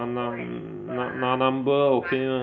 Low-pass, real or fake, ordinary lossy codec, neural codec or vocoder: 7.2 kHz; real; none; none